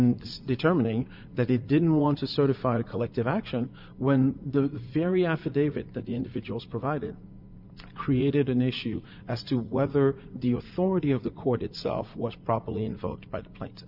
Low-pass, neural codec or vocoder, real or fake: 5.4 kHz; vocoder, 44.1 kHz, 80 mel bands, Vocos; fake